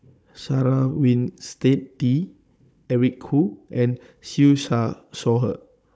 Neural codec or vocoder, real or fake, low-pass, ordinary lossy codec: codec, 16 kHz, 16 kbps, FunCodec, trained on Chinese and English, 50 frames a second; fake; none; none